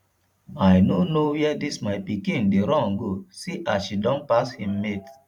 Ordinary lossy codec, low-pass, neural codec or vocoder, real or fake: none; 19.8 kHz; vocoder, 44.1 kHz, 128 mel bands every 512 samples, BigVGAN v2; fake